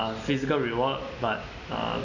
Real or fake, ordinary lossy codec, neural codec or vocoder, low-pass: real; none; none; 7.2 kHz